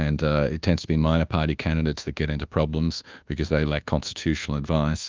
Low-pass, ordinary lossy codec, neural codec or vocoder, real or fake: 7.2 kHz; Opus, 24 kbps; codec, 24 kHz, 1.2 kbps, DualCodec; fake